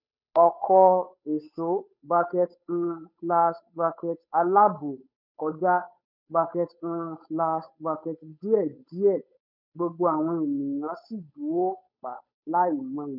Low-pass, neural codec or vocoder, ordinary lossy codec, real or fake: 5.4 kHz; codec, 16 kHz, 8 kbps, FunCodec, trained on Chinese and English, 25 frames a second; none; fake